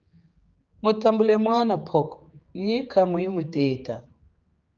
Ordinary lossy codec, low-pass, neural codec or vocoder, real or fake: Opus, 24 kbps; 7.2 kHz; codec, 16 kHz, 4 kbps, X-Codec, HuBERT features, trained on general audio; fake